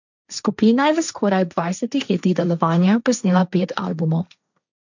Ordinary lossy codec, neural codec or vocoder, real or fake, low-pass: none; codec, 16 kHz, 1.1 kbps, Voila-Tokenizer; fake; 7.2 kHz